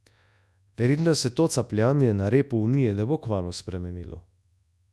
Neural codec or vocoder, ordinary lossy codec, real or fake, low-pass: codec, 24 kHz, 0.9 kbps, WavTokenizer, large speech release; none; fake; none